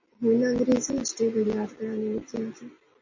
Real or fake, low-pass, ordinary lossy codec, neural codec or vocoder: real; 7.2 kHz; MP3, 32 kbps; none